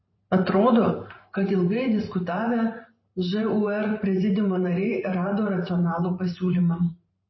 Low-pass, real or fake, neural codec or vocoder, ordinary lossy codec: 7.2 kHz; fake; vocoder, 44.1 kHz, 128 mel bands every 512 samples, BigVGAN v2; MP3, 24 kbps